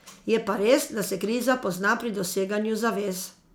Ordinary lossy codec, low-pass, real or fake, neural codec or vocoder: none; none; real; none